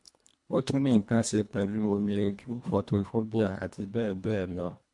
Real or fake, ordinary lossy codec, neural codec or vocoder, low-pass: fake; MP3, 64 kbps; codec, 24 kHz, 1.5 kbps, HILCodec; 10.8 kHz